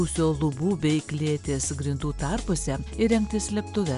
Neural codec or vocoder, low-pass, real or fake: none; 10.8 kHz; real